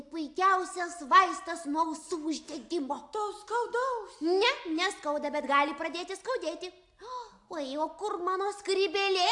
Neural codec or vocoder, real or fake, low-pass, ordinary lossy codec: none; real; 10.8 kHz; AAC, 64 kbps